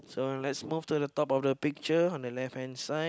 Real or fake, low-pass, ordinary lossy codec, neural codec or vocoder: real; none; none; none